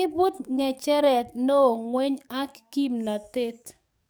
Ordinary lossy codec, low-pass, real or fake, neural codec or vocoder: none; none; fake; codec, 44.1 kHz, 7.8 kbps, DAC